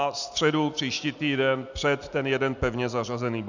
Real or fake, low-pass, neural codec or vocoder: real; 7.2 kHz; none